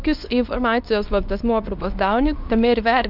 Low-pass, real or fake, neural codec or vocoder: 5.4 kHz; fake; codec, 24 kHz, 0.9 kbps, WavTokenizer, medium speech release version 2